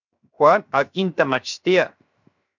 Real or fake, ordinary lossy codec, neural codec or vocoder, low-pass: fake; MP3, 64 kbps; codec, 16 kHz, 0.7 kbps, FocalCodec; 7.2 kHz